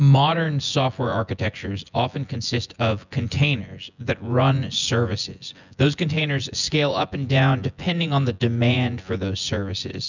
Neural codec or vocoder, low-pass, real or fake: vocoder, 24 kHz, 100 mel bands, Vocos; 7.2 kHz; fake